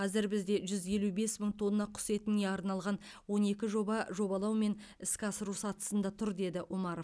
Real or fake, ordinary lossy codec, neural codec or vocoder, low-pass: real; none; none; none